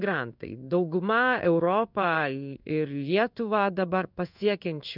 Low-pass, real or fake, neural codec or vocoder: 5.4 kHz; fake; codec, 16 kHz in and 24 kHz out, 1 kbps, XY-Tokenizer